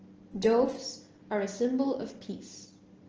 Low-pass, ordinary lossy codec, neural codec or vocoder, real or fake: 7.2 kHz; Opus, 16 kbps; none; real